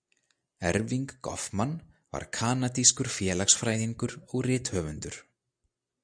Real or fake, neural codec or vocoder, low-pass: real; none; 9.9 kHz